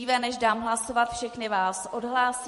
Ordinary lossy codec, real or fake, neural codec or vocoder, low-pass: MP3, 48 kbps; fake; vocoder, 44.1 kHz, 128 mel bands every 256 samples, BigVGAN v2; 14.4 kHz